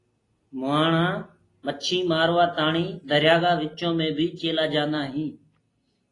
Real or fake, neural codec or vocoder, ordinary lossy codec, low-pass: real; none; AAC, 32 kbps; 10.8 kHz